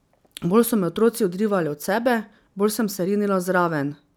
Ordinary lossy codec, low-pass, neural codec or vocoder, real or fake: none; none; none; real